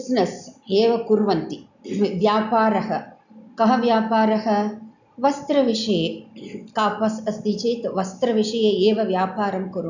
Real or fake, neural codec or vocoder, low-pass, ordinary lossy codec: real; none; 7.2 kHz; none